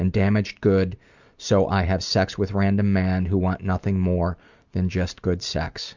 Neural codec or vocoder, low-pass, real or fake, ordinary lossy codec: none; 7.2 kHz; real; Opus, 64 kbps